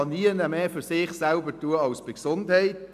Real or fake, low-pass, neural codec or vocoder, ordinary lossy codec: real; 14.4 kHz; none; none